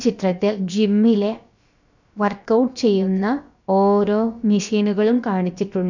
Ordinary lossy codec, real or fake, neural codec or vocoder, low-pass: none; fake; codec, 16 kHz, about 1 kbps, DyCAST, with the encoder's durations; 7.2 kHz